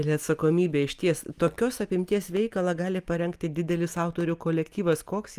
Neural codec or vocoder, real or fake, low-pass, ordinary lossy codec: none; real; 14.4 kHz; Opus, 32 kbps